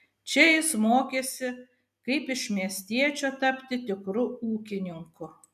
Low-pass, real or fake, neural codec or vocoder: 14.4 kHz; real; none